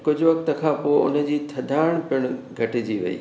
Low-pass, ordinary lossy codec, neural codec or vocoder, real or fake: none; none; none; real